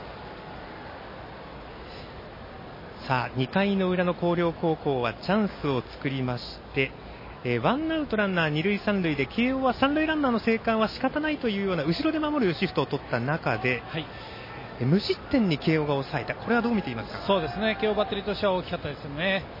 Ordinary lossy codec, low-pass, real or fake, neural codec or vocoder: MP3, 24 kbps; 5.4 kHz; real; none